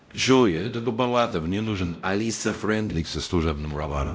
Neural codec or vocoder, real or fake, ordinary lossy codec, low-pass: codec, 16 kHz, 0.5 kbps, X-Codec, WavLM features, trained on Multilingual LibriSpeech; fake; none; none